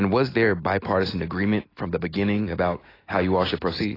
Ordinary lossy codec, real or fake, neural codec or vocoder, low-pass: AAC, 24 kbps; real; none; 5.4 kHz